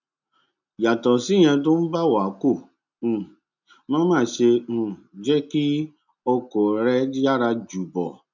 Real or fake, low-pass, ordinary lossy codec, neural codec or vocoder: real; 7.2 kHz; none; none